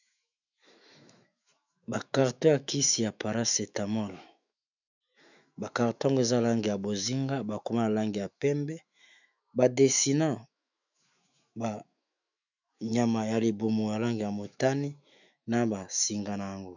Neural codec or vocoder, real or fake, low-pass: autoencoder, 48 kHz, 128 numbers a frame, DAC-VAE, trained on Japanese speech; fake; 7.2 kHz